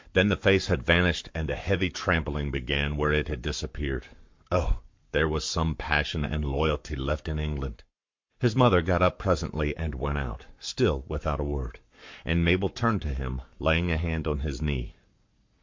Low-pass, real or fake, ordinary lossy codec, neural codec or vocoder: 7.2 kHz; fake; MP3, 48 kbps; codec, 44.1 kHz, 7.8 kbps, Pupu-Codec